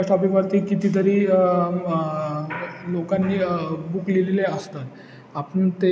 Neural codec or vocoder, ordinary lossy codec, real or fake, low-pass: none; none; real; none